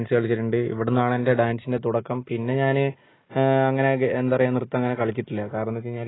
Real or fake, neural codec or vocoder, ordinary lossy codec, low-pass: real; none; AAC, 16 kbps; 7.2 kHz